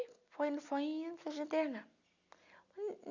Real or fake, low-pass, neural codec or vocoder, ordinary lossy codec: real; 7.2 kHz; none; none